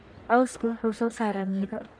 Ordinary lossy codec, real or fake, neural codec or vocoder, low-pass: none; fake; codec, 44.1 kHz, 1.7 kbps, Pupu-Codec; 9.9 kHz